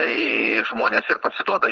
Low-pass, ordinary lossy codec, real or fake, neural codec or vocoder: 7.2 kHz; Opus, 16 kbps; fake; codec, 16 kHz, 4.8 kbps, FACodec